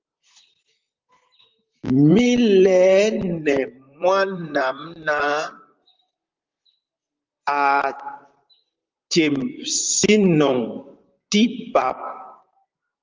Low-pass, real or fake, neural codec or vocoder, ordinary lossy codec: 7.2 kHz; fake; vocoder, 44.1 kHz, 128 mel bands, Pupu-Vocoder; Opus, 32 kbps